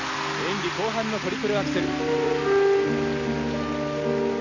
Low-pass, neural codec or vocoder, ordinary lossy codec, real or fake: 7.2 kHz; none; none; real